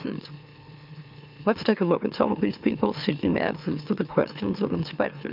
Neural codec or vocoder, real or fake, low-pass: autoencoder, 44.1 kHz, a latent of 192 numbers a frame, MeloTTS; fake; 5.4 kHz